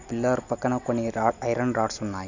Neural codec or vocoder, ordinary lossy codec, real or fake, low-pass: none; none; real; 7.2 kHz